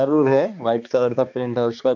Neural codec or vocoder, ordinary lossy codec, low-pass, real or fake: codec, 16 kHz, 2 kbps, X-Codec, HuBERT features, trained on balanced general audio; none; 7.2 kHz; fake